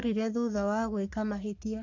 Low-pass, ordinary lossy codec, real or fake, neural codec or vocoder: 7.2 kHz; AAC, 48 kbps; fake; codec, 44.1 kHz, 3.4 kbps, Pupu-Codec